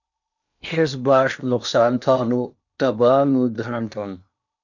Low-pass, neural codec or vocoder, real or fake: 7.2 kHz; codec, 16 kHz in and 24 kHz out, 0.8 kbps, FocalCodec, streaming, 65536 codes; fake